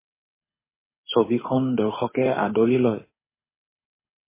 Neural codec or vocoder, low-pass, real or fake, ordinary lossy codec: codec, 24 kHz, 6 kbps, HILCodec; 3.6 kHz; fake; MP3, 16 kbps